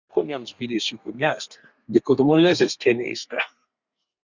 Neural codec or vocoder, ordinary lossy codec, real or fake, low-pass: codec, 32 kHz, 1.9 kbps, SNAC; Opus, 64 kbps; fake; 7.2 kHz